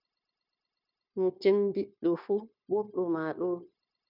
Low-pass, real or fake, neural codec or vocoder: 5.4 kHz; fake; codec, 16 kHz, 0.9 kbps, LongCat-Audio-Codec